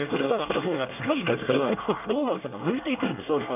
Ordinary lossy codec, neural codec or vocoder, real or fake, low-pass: none; codec, 24 kHz, 1 kbps, SNAC; fake; 3.6 kHz